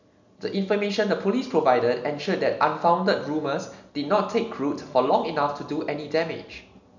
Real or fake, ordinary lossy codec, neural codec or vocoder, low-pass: real; none; none; 7.2 kHz